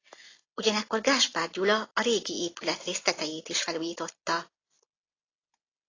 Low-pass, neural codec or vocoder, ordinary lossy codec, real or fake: 7.2 kHz; none; AAC, 32 kbps; real